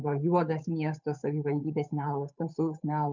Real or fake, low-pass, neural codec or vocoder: fake; 7.2 kHz; codec, 16 kHz, 8 kbps, FunCodec, trained on Chinese and English, 25 frames a second